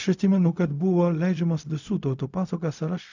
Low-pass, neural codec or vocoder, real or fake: 7.2 kHz; codec, 16 kHz, 0.4 kbps, LongCat-Audio-Codec; fake